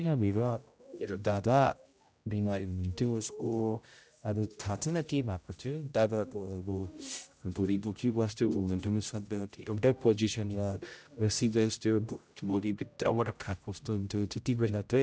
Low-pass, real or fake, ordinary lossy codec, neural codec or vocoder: none; fake; none; codec, 16 kHz, 0.5 kbps, X-Codec, HuBERT features, trained on general audio